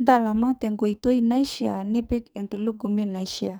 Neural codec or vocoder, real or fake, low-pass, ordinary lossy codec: codec, 44.1 kHz, 2.6 kbps, SNAC; fake; none; none